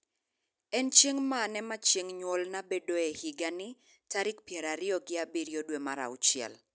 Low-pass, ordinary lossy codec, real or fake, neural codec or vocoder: none; none; real; none